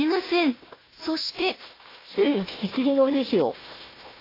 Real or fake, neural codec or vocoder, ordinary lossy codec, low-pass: fake; codec, 16 kHz, 1 kbps, FunCodec, trained on Chinese and English, 50 frames a second; AAC, 24 kbps; 5.4 kHz